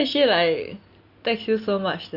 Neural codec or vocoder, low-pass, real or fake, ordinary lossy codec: none; 5.4 kHz; real; none